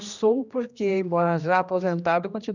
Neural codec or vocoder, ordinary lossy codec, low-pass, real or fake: codec, 16 kHz, 1 kbps, X-Codec, HuBERT features, trained on general audio; none; 7.2 kHz; fake